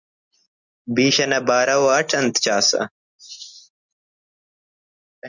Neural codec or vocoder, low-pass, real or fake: none; 7.2 kHz; real